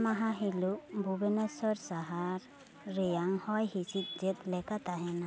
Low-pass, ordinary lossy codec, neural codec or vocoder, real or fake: none; none; none; real